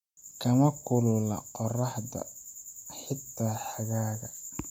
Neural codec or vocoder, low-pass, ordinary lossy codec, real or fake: none; 19.8 kHz; MP3, 96 kbps; real